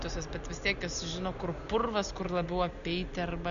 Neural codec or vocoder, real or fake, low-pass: none; real; 7.2 kHz